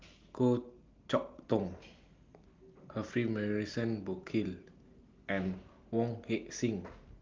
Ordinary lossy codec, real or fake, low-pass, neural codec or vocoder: Opus, 32 kbps; real; 7.2 kHz; none